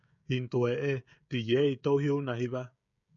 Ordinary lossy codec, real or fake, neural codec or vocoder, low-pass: MP3, 64 kbps; fake; codec, 16 kHz, 16 kbps, FreqCodec, smaller model; 7.2 kHz